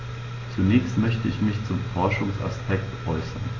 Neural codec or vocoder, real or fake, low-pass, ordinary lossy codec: none; real; 7.2 kHz; AAC, 32 kbps